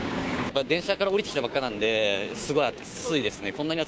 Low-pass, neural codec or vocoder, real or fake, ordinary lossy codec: none; codec, 16 kHz, 6 kbps, DAC; fake; none